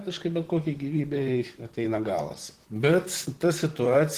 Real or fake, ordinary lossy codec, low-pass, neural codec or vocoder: fake; Opus, 16 kbps; 14.4 kHz; vocoder, 44.1 kHz, 128 mel bands, Pupu-Vocoder